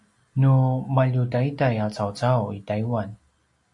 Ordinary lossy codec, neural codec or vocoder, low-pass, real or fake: MP3, 48 kbps; none; 10.8 kHz; real